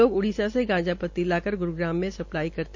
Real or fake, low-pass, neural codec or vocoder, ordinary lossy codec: real; 7.2 kHz; none; MP3, 48 kbps